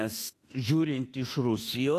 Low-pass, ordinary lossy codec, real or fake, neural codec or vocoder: 14.4 kHz; AAC, 48 kbps; fake; autoencoder, 48 kHz, 32 numbers a frame, DAC-VAE, trained on Japanese speech